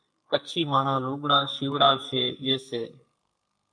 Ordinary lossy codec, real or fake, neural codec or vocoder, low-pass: MP3, 64 kbps; fake; codec, 44.1 kHz, 2.6 kbps, SNAC; 9.9 kHz